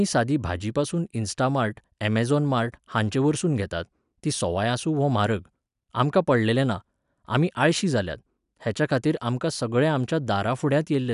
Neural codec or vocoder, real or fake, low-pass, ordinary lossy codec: none; real; 10.8 kHz; none